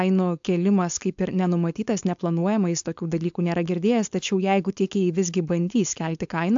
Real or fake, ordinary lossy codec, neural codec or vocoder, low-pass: fake; AAC, 64 kbps; codec, 16 kHz, 4.8 kbps, FACodec; 7.2 kHz